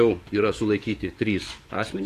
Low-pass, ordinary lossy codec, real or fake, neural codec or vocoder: 14.4 kHz; AAC, 48 kbps; fake; codec, 44.1 kHz, 7.8 kbps, DAC